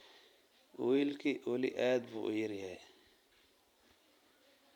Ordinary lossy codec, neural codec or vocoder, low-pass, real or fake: none; vocoder, 44.1 kHz, 128 mel bands every 512 samples, BigVGAN v2; 19.8 kHz; fake